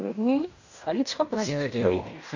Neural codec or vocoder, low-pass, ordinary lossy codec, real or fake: codec, 16 kHz in and 24 kHz out, 0.9 kbps, LongCat-Audio-Codec, fine tuned four codebook decoder; 7.2 kHz; none; fake